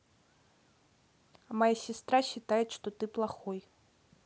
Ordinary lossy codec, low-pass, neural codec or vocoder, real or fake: none; none; none; real